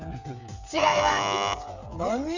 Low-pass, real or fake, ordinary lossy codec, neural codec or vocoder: 7.2 kHz; fake; none; codec, 16 kHz, 16 kbps, FreqCodec, smaller model